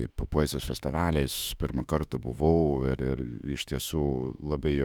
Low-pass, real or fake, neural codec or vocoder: 19.8 kHz; fake; autoencoder, 48 kHz, 32 numbers a frame, DAC-VAE, trained on Japanese speech